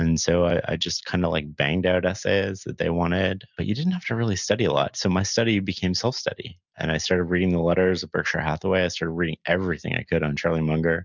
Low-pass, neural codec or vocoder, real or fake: 7.2 kHz; none; real